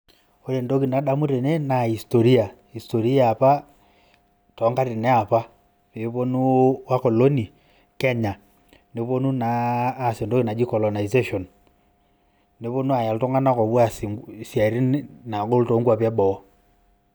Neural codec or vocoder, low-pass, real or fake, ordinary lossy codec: none; none; real; none